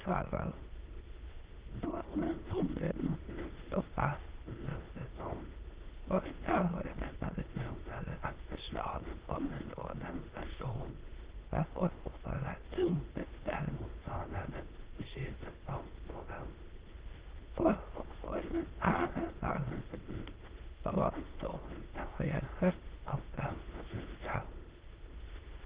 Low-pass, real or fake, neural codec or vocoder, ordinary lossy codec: 3.6 kHz; fake; autoencoder, 22.05 kHz, a latent of 192 numbers a frame, VITS, trained on many speakers; Opus, 16 kbps